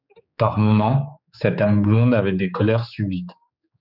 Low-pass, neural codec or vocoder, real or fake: 5.4 kHz; codec, 16 kHz, 4 kbps, X-Codec, HuBERT features, trained on general audio; fake